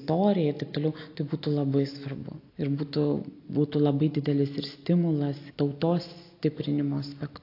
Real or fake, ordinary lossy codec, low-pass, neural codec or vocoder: real; AAC, 32 kbps; 5.4 kHz; none